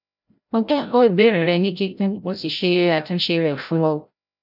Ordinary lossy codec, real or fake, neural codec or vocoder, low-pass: none; fake; codec, 16 kHz, 0.5 kbps, FreqCodec, larger model; 5.4 kHz